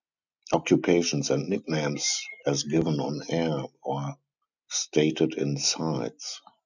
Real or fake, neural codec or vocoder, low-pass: real; none; 7.2 kHz